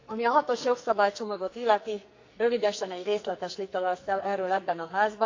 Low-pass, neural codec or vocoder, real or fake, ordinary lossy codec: 7.2 kHz; codec, 44.1 kHz, 2.6 kbps, SNAC; fake; MP3, 64 kbps